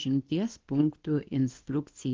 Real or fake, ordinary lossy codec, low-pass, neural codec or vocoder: fake; Opus, 16 kbps; 7.2 kHz; codec, 24 kHz, 0.9 kbps, WavTokenizer, medium speech release version 2